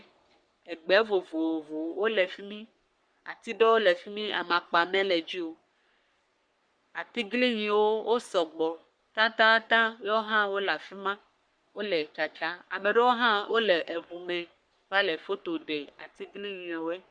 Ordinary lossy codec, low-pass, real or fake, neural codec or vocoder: Opus, 64 kbps; 9.9 kHz; fake; codec, 44.1 kHz, 3.4 kbps, Pupu-Codec